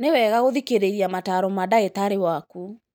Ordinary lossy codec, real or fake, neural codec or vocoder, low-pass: none; real; none; none